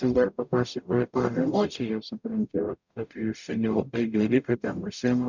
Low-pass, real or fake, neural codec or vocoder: 7.2 kHz; fake; codec, 44.1 kHz, 0.9 kbps, DAC